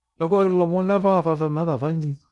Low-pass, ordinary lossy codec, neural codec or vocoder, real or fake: 10.8 kHz; none; codec, 16 kHz in and 24 kHz out, 0.6 kbps, FocalCodec, streaming, 2048 codes; fake